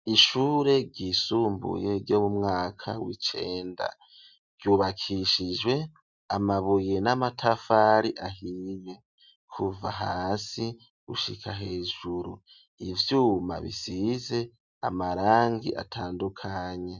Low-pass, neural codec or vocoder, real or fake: 7.2 kHz; none; real